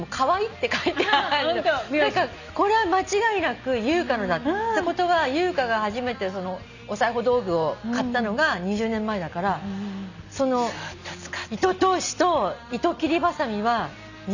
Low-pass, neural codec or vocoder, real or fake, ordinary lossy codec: 7.2 kHz; none; real; none